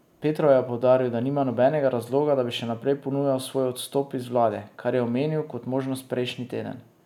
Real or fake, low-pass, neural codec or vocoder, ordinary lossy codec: real; 19.8 kHz; none; none